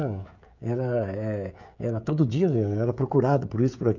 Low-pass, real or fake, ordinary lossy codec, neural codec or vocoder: 7.2 kHz; fake; none; codec, 16 kHz, 16 kbps, FreqCodec, smaller model